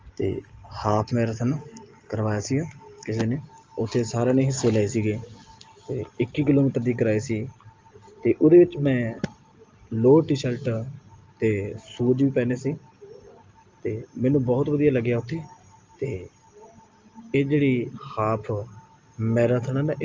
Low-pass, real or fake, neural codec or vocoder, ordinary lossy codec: 7.2 kHz; real; none; Opus, 24 kbps